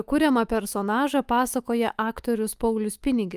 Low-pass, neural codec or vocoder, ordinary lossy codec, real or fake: 14.4 kHz; autoencoder, 48 kHz, 128 numbers a frame, DAC-VAE, trained on Japanese speech; Opus, 32 kbps; fake